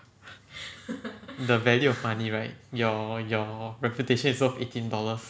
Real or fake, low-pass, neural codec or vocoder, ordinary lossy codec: real; none; none; none